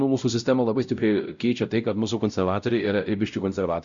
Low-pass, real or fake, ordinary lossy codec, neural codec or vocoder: 7.2 kHz; fake; Opus, 64 kbps; codec, 16 kHz, 0.5 kbps, X-Codec, WavLM features, trained on Multilingual LibriSpeech